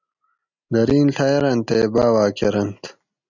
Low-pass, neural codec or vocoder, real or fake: 7.2 kHz; none; real